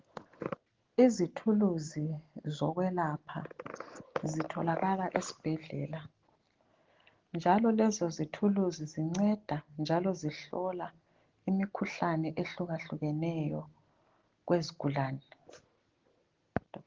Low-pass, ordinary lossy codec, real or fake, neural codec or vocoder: 7.2 kHz; Opus, 16 kbps; real; none